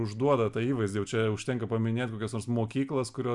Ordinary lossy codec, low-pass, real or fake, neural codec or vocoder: MP3, 96 kbps; 10.8 kHz; real; none